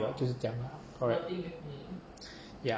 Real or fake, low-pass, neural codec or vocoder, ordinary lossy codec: real; none; none; none